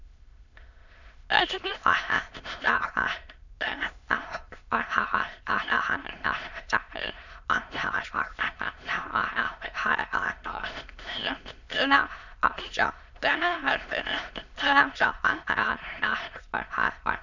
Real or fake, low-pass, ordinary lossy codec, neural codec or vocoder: fake; 7.2 kHz; none; autoencoder, 22.05 kHz, a latent of 192 numbers a frame, VITS, trained on many speakers